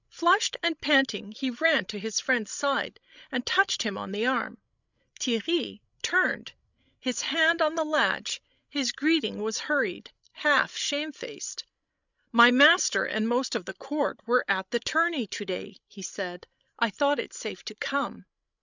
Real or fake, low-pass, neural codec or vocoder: fake; 7.2 kHz; codec, 16 kHz, 16 kbps, FreqCodec, larger model